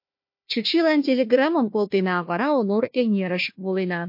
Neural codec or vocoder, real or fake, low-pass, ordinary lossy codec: codec, 16 kHz, 1 kbps, FunCodec, trained on Chinese and English, 50 frames a second; fake; 5.4 kHz; MP3, 32 kbps